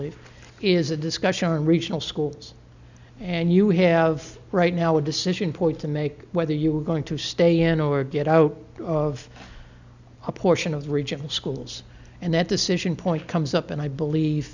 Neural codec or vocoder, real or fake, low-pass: none; real; 7.2 kHz